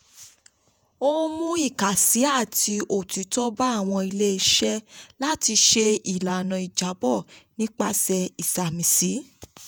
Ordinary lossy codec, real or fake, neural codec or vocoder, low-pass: none; fake; vocoder, 48 kHz, 128 mel bands, Vocos; none